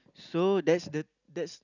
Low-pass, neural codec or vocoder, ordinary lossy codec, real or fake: 7.2 kHz; none; none; real